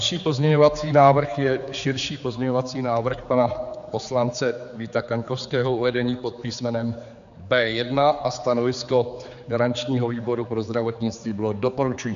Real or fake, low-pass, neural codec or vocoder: fake; 7.2 kHz; codec, 16 kHz, 4 kbps, X-Codec, HuBERT features, trained on general audio